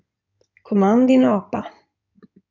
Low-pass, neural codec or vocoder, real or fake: 7.2 kHz; codec, 16 kHz in and 24 kHz out, 2.2 kbps, FireRedTTS-2 codec; fake